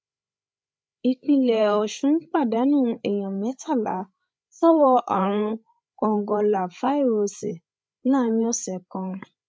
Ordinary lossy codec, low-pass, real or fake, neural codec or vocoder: none; none; fake; codec, 16 kHz, 8 kbps, FreqCodec, larger model